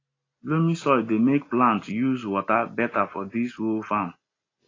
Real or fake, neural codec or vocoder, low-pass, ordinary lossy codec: real; none; 7.2 kHz; AAC, 32 kbps